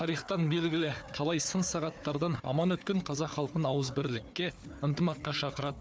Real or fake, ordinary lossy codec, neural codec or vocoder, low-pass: fake; none; codec, 16 kHz, 4 kbps, FunCodec, trained on Chinese and English, 50 frames a second; none